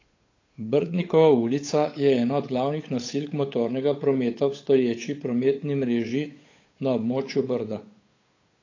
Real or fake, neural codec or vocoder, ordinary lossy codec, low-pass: fake; codec, 16 kHz, 8 kbps, FunCodec, trained on Chinese and English, 25 frames a second; AAC, 32 kbps; 7.2 kHz